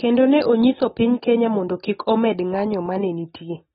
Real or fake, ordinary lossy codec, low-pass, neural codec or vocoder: real; AAC, 16 kbps; 7.2 kHz; none